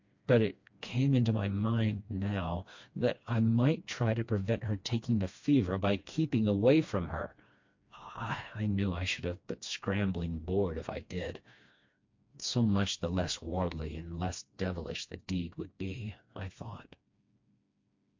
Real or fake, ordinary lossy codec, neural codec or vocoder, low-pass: fake; MP3, 48 kbps; codec, 16 kHz, 2 kbps, FreqCodec, smaller model; 7.2 kHz